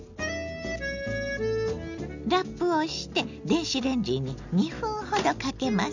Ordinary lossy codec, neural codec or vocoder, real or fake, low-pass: none; none; real; 7.2 kHz